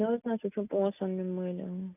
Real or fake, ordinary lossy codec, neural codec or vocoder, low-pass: real; none; none; 3.6 kHz